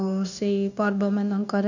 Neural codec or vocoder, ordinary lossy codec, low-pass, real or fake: codec, 16 kHz, 0.9 kbps, LongCat-Audio-Codec; none; 7.2 kHz; fake